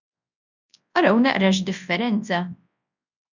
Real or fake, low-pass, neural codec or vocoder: fake; 7.2 kHz; codec, 24 kHz, 0.9 kbps, WavTokenizer, large speech release